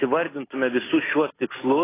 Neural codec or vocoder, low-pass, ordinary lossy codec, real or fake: none; 3.6 kHz; AAC, 16 kbps; real